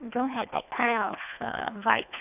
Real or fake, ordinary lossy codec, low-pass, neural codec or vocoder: fake; none; 3.6 kHz; codec, 24 kHz, 1.5 kbps, HILCodec